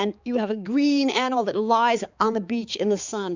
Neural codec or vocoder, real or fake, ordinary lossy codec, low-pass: codec, 16 kHz, 4 kbps, X-Codec, HuBERT features, trained on balanced general audio; fake; Opus, 64 kbps; 7.2 kHz